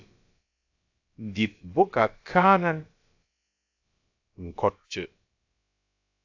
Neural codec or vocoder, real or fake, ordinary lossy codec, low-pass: codec, 16 kHz, about 1 kbps, DyCAST, with the encoder's durations; fake; AAC, 48 kbps; 7.2 kHz